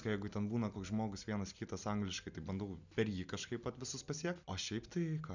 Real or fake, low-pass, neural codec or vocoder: real; 7.2 kHz; none